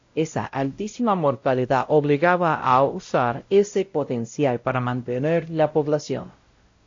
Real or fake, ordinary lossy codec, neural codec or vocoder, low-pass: fake; AAC, 48 kbps; codec, 16 kHz, 0.5 kbps, X-Codec, WavLM features, trained on Multilingual LibriSpeech; 7.2 kHz